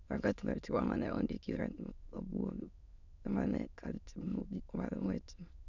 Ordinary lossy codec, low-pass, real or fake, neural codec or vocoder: none; 7.2 kHz; fake; autoencoder, 22.05 kHz, a latent of 192 numbers a frame, VITS, trained on many speakers